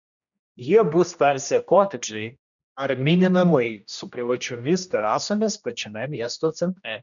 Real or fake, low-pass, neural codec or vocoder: fake; 7.2 kHz; codec, 16 kHz, 1 kbps, X-Codec, HuBERT features, trained on general audio